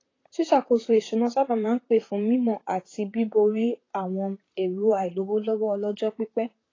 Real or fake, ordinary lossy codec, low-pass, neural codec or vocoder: fake; AAC, 32 kbps; 7.2 kHz; vocoder, 44.1 kHz, 128 mel bands, Pupu-Vocoder